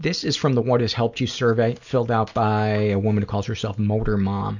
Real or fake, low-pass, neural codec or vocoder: real; 7.2 kHz; none